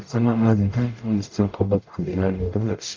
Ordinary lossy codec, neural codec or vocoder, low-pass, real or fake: Opus, 32 kbps; codec, 44.1 kHz, 0.9 kbps, DAC; 7.2 kHz; fake